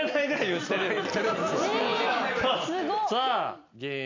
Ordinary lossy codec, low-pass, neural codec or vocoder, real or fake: MP3, 64 kbps; 7.2 kHz; none; real